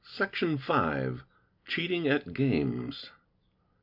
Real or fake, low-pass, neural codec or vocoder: real; 5.4 kHz; none